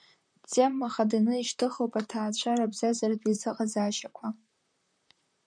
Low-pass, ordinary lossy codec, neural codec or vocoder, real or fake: 9.9 kHz; MP3, 96 kbps; vocoder, 44.1 kHz, 128 mel bands, Pupu-Vocoder; fake